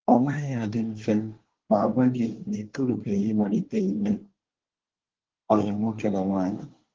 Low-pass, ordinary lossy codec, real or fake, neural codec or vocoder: 7.2 kHz; Opus, 16 kbps; fake; codec, 24 kHz, 1 kbps, SNAC